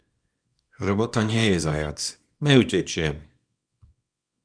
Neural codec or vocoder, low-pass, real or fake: codec, 24 kHz, 0.9 kbps, WavTokenizer, small release; 9.9 kHz; fake